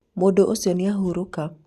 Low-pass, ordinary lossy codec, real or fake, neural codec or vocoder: 14.4 kHz; Opus, 64 kbps; real; none